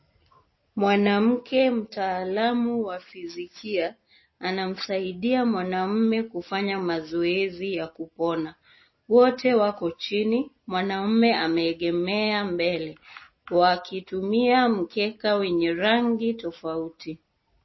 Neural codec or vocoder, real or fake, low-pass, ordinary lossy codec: none; real; 7.2 kHz; MP3, 24 kbps